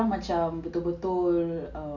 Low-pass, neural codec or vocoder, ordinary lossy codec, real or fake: 7.2 kHz; none; none; real